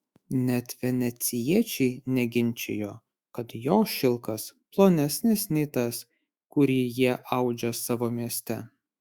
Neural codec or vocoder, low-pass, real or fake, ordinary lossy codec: autoencoder, 48 kHz, 128 numbers a frame, DAC-VAE, trained on Japanese speech; 19.8 kHz; fake; Opus, 64 kbps